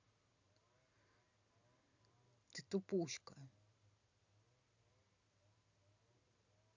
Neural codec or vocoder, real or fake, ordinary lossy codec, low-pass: none; real; none; 7.2 kHz